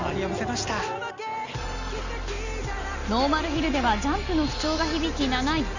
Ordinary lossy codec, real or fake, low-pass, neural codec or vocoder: none; real; 7.2 kHz; none